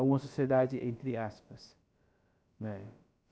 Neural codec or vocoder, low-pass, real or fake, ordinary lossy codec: codec, 16 kHz, about 1 kbps, DyCAST, with the encoder's durations; none; fake; none